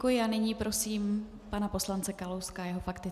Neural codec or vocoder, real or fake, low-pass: none; real; 14.4 kHz